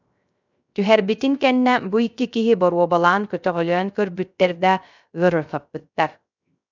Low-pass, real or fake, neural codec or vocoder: 7.2 kHz; fake; codec, 16 kHz, 0.3 kbps, FocalCodec